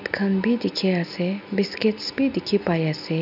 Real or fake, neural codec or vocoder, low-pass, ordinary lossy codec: real; none; 5.4 kHz; none